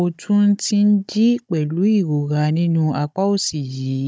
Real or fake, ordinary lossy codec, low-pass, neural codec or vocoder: fake; none; none; codec, 16 kHz, 16 kbps, FunCodec, trained on Chinese and English, 50 frames a second